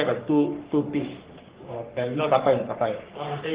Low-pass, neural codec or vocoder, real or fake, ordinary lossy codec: 3.6 kHz; codec, 44.1 kHz, 3.4 kbps, Pupu-Codec; fake; Opus, 16 kbps